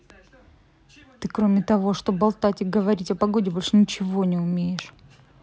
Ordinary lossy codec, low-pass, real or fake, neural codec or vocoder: none; none; real; none